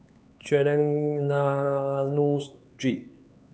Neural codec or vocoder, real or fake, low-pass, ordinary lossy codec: codec, 16 kHz, 4 kbps, X-Codec, HuBERT features, trained on LibriSpeech; fake; none; none